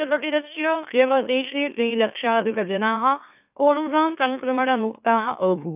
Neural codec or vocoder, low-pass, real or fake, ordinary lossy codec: autoencoder, 44.1 kHz, a latent of 192 numbers a frame, MeloTTS; 3.6 kHz; fake; none